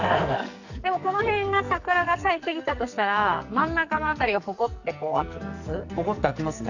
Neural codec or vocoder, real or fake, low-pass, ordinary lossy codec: codec, 44.1 kHz, 2.6 kbps, SNAC; fake; 7.2 kHz; none